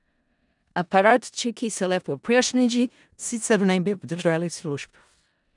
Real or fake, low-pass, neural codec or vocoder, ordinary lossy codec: fake; 10.8 kHz; codec, 16 kHz in and 24 kHz out, 0.4 kbps, LongCat-Audio-Codec, four codebook decoder; none